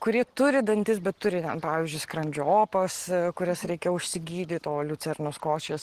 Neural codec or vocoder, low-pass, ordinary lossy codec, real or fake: none; 14.4 kHz; Opus, 16 kbps; real